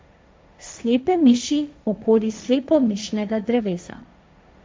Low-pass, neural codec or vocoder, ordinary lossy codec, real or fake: none; codec, 16 kHz, 1.1 kbps, Voila-Tokenizer; none; fake